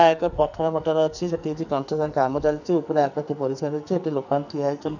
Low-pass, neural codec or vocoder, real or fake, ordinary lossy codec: 7.2 kHz; codec, 44.1 kHz, 2.6 kbps, SNAC; fake; none